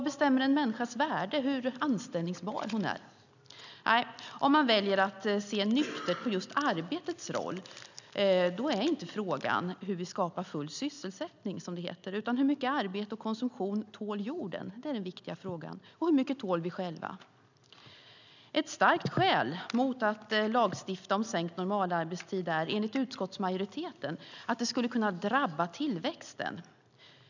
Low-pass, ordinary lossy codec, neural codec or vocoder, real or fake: 7.2 kHz; none; none; real